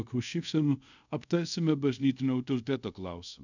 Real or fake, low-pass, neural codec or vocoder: fake; 7.2 kHz; codec, 24 kHz, 0.5 kbps, DualCodec